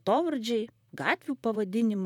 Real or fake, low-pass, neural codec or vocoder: fake; 19.8 kHz; vocoder, 44.1 kHz, 128 mel bands, Pupu-Vocoder